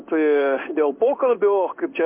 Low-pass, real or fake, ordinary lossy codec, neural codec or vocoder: 3.6 kHz; fake; MP3, 32 kbps; codec, 16 kHz in and 24 kHz out, 1 kbps, XY-Tokenizer